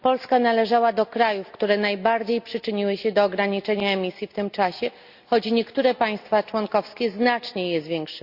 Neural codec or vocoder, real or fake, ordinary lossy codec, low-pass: none; real; Opus, 64 kbps; 5.4 kHz